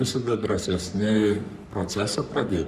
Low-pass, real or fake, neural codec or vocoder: 14.4 kHz; fake; codec, 44.1 kHz, 3.4 kbps, Pupu-Codec